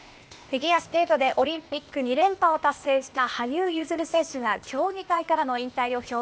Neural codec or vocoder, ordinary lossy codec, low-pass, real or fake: codec, 16 kHz, 0.8 kbps, ZipCodec; none; none; fake